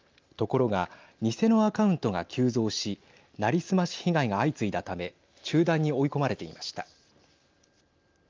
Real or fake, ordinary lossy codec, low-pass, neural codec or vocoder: real; Opus, 24 kbps; 7.2 kHz; none